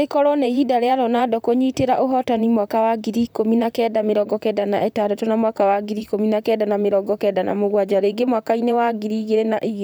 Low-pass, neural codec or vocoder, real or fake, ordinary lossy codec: none; vocoder, 44.1 kHz, 128 mel bands, Pupu-Vocoder; fake; none